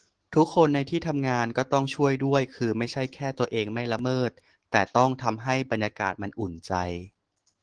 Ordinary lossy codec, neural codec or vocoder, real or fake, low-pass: Opus, 16 kbps; autoencoder, 48 kHz, 128 numbers a frame, DAC-VAE, trained on Japanese speech; fake; 9.9 kHz